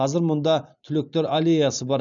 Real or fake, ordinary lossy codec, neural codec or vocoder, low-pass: real; MP3, 96 kbps; none; 7.2 kHz